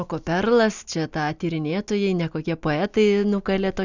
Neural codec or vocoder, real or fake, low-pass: none; real; 7.2 kHz